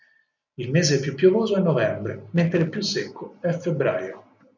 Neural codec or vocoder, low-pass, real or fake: none; 7.2 kHz; real